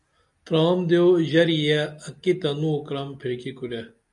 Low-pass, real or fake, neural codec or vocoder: 10.8 kHz; real; none